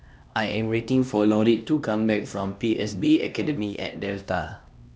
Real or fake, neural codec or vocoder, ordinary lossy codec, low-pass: fake; codec, 16 kHz, 1 kbps, X-Codec, HuBERT features, trained on LibriSpeech; none; none